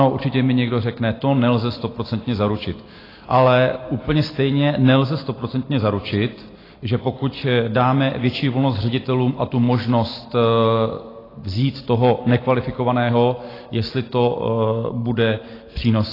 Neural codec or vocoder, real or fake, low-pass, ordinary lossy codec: none; real; 5.4 kHz; AAC, 24 kbps